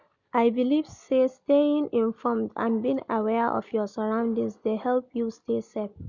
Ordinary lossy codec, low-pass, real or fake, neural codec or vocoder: none; 7.2 kHz; real; none